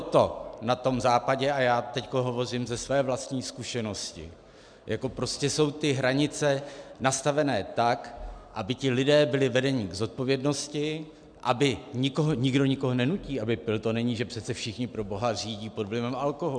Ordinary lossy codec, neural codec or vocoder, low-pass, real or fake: MP3, 96 kbps; none; 9.9 kHz; real